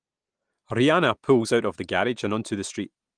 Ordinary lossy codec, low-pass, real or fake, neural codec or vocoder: Opus, 24 kbps; 9.9 kHz; real; none